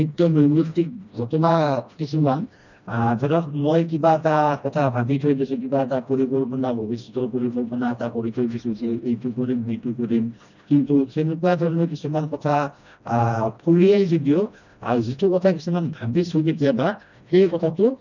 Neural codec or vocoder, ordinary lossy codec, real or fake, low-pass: codec, 16 kHz, 1 kbps, FreqCodec, smaller model; none; fake; 7.2 kHz